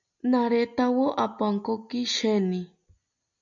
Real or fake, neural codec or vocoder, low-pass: real; none; 7.2 kHz